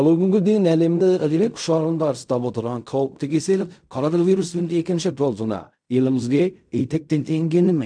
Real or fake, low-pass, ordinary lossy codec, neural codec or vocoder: fake; 9.9 kHz; none; codec, 16 kHz in and 24 kHz out, 0.4 kbps, LongCat-Audio-Codec, fine tuned four codebook decoder